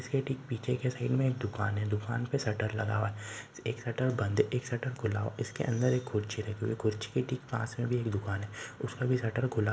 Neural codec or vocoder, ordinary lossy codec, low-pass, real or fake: none; none; none; real